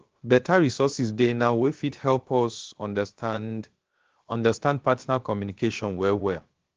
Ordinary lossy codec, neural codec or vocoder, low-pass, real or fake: Opus, 16 kbps; codec, 16 kHz, about 1 kbps, DyCAST, with the encoder's durations; 7.2 kHz; fake